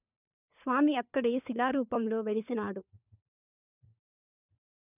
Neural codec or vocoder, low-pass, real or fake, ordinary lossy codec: codec, 16 kHz, 4 kbps, FunCodec, trained on LibriTTS, 50 frames a second; 3.6 kHz; fake; none